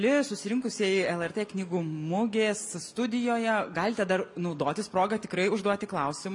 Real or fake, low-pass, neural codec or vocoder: real; 10.8 kHz; none